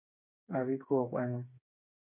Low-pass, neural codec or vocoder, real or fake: 3.6 kHz; codec, 16 kHz, 4 kbps, FreqCodec, smaller model; fake